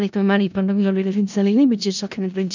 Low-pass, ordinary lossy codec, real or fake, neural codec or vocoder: 7.2 kHz; none; fake; codec, 16 kHz in and 24 kHz out, 0.4 kbps, LongCat-Audio-Codec, four codebook decoder